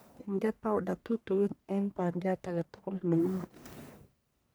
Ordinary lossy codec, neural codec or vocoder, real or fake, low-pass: none; codec, 44.1 kHz, 1.7 kbps, Pupu-Codec; fake; none